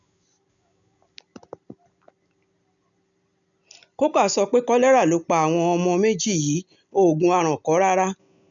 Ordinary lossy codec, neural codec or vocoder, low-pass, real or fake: none; none; 7.2 kHz; real